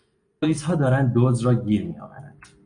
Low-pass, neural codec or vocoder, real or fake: 9.9 kHz; none; real